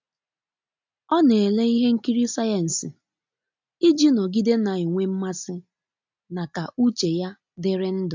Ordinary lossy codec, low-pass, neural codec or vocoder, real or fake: MP3, 64 kbps; 7.2 kHz; none; real